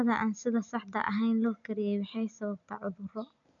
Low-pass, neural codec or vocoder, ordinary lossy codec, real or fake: 7.2 kHz; none; none; real